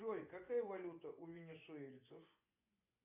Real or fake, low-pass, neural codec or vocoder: fake; 3.6 kHz; vocoder, 24 kHz, 100 mel bands, Vocos